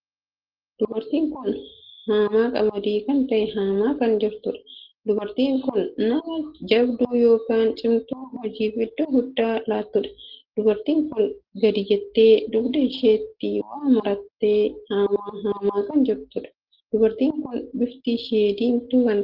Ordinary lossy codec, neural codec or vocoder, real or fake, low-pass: Opus, 16 kbps; none; real; 5.4 kHz